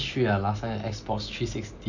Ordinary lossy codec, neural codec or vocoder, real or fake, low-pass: none; none; real; 7.2 kHz